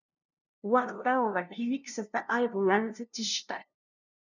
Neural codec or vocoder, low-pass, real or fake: codec, 16 kHz, 0.5 kbps, FunCodec, trained on LibriTTS, 25 frames a second; 7.2 kHz; fake